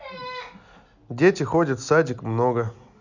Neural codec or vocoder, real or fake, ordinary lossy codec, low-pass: none; real; none; 7.2 kHz